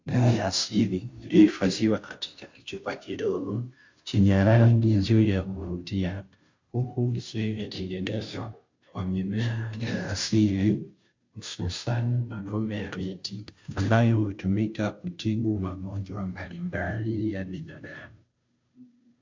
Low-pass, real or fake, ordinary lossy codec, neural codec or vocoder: 7.2 kHz; fake; MP3, 64 kbps; codec, 16 kHz, 0.5 kbps, FunCodec, trained on Chinese and English, 25 frames a second